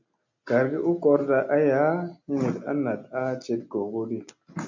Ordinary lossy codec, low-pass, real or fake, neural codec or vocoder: MP3, 64 kbps; 7.2 kHz; real; none